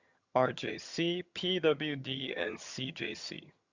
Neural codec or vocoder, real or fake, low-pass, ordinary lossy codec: vocoder, 22.05 kHz, 80 mel bands, HiFi-GAN; fake; 7.2 kHz; Opus, 64 kbps